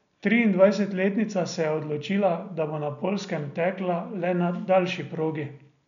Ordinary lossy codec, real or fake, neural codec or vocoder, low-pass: none; real; none; 7.2 kHz